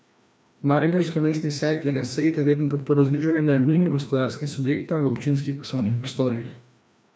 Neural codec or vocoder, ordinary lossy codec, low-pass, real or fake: codec, 16 kHz, 1 kbps, FreqCodec, larger model; none; none; fake